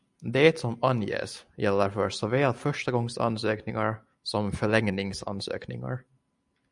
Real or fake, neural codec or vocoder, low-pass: real; none; 10.8 kHz